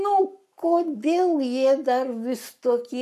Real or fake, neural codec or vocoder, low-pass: fake; codec, 44.1 kHz, 7.8 kbps, Pupu-Codec; 14.4 kHz